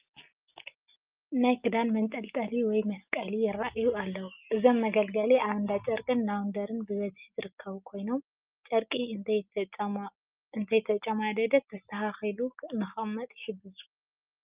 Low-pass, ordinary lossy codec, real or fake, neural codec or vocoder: 3.6 kHz; Opus, 24 kbps; real; none